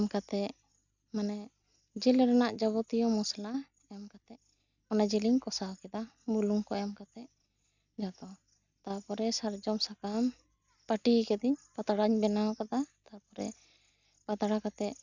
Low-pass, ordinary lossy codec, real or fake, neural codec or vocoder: 7.2 kHz; none; real; none